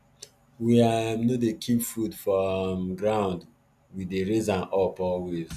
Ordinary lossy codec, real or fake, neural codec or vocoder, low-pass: none; real; none; 14.4 kHz